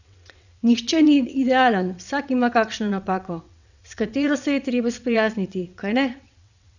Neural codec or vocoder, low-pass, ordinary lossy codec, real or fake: vocoder, 22.05 kHz, 80 mel bands, WaveNeXt; 7.2 kHz; none; fake